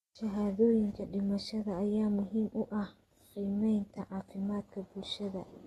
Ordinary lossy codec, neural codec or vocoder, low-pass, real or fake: AAC, 32 kbps; none; 19.8 kHz; real